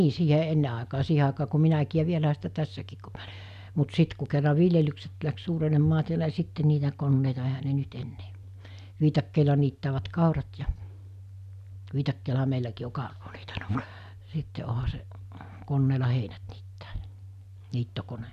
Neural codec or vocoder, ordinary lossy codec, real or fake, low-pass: none; none; real; 14.4 kHz